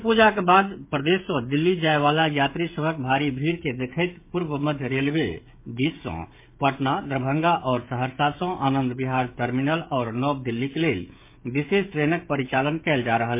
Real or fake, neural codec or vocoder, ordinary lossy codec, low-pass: fake; codec, 16 kHz, 16 kbps, FreqCodec, smaller model; MP3, 24 kbps; 3.6 kHz